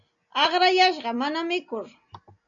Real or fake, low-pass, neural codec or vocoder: real; 7.2 kHz; none